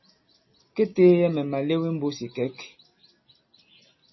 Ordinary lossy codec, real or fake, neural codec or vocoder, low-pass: MP3, 24 kbps; real; none; 7.2 kHz